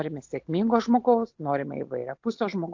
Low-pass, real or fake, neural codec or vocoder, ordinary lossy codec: 7.2 kHz; real; none; AAC, 48 kbps